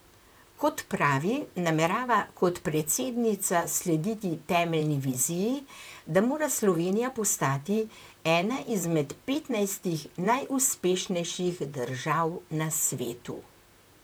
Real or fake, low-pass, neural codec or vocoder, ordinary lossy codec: fake; none; vocoder, 44.1 kHz, 128 mel bands, Pupu-Vocoder; none